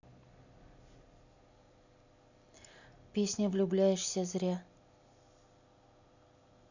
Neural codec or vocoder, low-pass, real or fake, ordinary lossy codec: none; 7.2 kHz; real; none